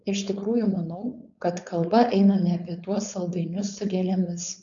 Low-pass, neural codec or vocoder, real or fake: 7.2 kHz; codec, 16 kHz, 4.8 kbps, FACodec; fake